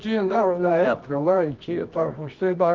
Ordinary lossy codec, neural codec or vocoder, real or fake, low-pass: Opus, 24 kbps; codec, 24 kHz, 0.9 kbps, WavTokenizer, medium music audio release; fake; 7.2 kHz